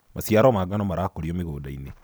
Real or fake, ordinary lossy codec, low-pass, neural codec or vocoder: real; none; none; none